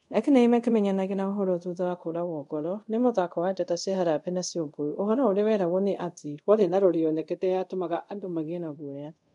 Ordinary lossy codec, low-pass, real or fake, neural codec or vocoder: MP3, 64 kbps; 10.8 kHz; fake; codec, 24 kHz, 0.5 kbps, DualCodec